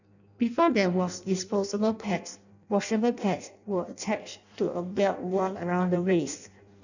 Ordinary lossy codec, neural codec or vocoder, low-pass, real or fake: none; codec, 16 kHz in and 24 kHz out, 0.6 kbps, FireRedTTS-2 codec; 7.2 kHz; fake